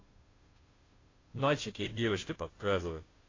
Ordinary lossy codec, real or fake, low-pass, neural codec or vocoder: AAC, 32 kbps; fake; 7.2 kHz; codec, 16 kHz, 0.5 kbps, FunCodec, trained on Chinese and English, 25 frames a second